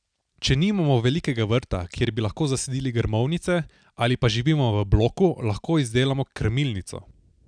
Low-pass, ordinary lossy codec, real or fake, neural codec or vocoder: 9.9 kHz; none; real; none